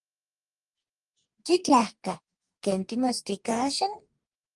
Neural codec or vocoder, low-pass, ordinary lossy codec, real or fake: codec, 44.1 kHz, 2.6 kbps, DAC; 10.8 kHz; Opus, 32 kbps; fake